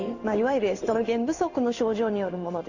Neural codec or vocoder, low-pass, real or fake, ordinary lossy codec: codec, 16 kHz in and 24 kHz out, 1 kbps, XY-Tokenizer; 7.2 kHz; fake; none